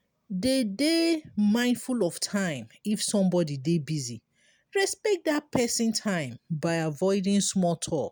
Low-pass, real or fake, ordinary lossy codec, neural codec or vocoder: none; real; none; none